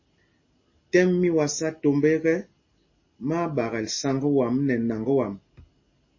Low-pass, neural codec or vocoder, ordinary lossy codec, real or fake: 7.2 kHz; none; MP3, 32 kbps; real